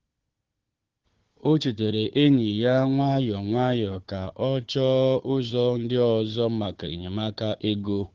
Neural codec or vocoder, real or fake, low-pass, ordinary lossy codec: codec, 16 kHz, 4 kbps, FunCodec, trained on Chinese and English, 50 frames a second; fake; 7.2 kHz; Opus, 16 kbps